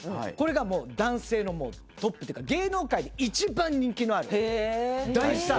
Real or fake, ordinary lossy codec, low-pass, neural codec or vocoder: real; none; none; none